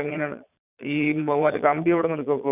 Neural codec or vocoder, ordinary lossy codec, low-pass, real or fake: vocoder, 22.05 kHz, 80 mel bands, Vocos; none; 3.6 kHz; fake